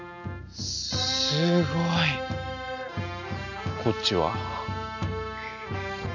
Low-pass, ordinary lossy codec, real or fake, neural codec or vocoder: 7.2 kHz; none; real; none